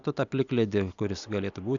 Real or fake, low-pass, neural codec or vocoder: real; 7.2 kHz; none